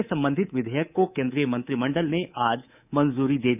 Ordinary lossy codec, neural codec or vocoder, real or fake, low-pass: none; codec, 24 kHz, 3.1 kbps, DualCodec; fake; 3.6 kHz